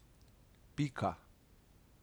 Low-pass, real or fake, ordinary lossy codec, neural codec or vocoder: none; real; none; none